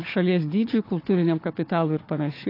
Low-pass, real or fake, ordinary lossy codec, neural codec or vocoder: 5.4 kHz; fake; AAC, 32 kbps; codec, 16 kHz, 4 kbps, FunCodec, trained on Chinese and English, 50 frames a second